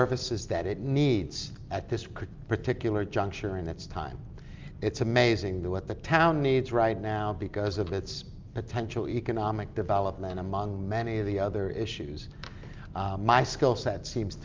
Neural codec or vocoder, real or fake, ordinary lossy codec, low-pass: none; real; Opus, 24 kbps; 7.2 kHz